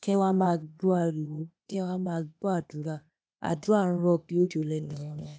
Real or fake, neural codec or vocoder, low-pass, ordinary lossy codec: fake; codec, 16 kHz, 0.8 kbps, ZipCodec; none; none